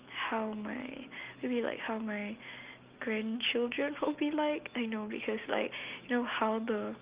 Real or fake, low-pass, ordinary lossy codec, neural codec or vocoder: real; 3.6 kHz; Opus, 32 kbps; none